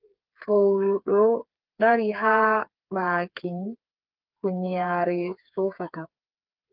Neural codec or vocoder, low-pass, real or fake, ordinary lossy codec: codec, 16 kHz, 4 kbps, FreqCodec, smaller model; 5.4 kHz; fake; Opus, 32 kbps